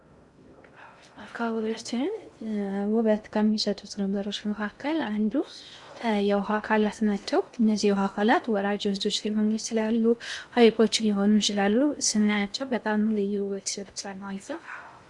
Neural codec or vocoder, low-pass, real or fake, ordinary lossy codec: codec, 16 kHz in and 24 kHz out, 0.6 kbps, FocalCodec, streaming, 2048 codes; 10.8 kHz; fake; Opus, 64 kbps